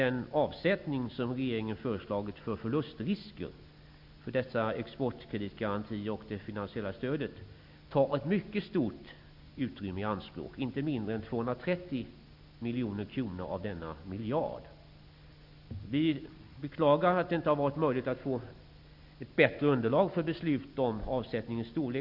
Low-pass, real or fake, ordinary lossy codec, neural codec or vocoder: 5.4 kHz; real; none; none